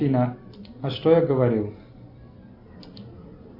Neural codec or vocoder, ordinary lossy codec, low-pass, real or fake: none; AAC, 48 kbps; 5.4 kHz; real